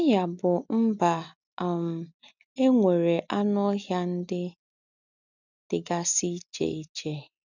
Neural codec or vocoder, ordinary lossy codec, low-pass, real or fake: none; none; 7.2 kHz; real